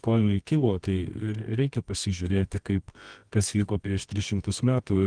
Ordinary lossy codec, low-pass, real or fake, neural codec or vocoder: Opus, 24 kbps; 9.9 kHz; fake; codec, 24 kHz, 0.9 kbps, WavTokenizer, medium music audio release